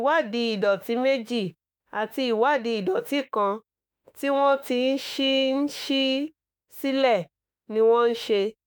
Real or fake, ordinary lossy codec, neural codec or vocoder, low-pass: fake; none; autoencoder, 48 kHz, 32 numbers a frame, DAC-VAE, trained on Japanese speech; none